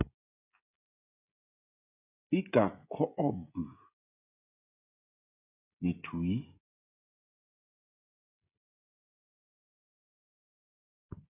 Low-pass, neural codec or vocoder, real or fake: 3.6 kHz; codec, 16 kHz, 16 kbps, FreqCodec, smaller model; fake